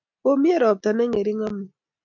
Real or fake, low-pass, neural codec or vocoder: real; 7.2 kHz; none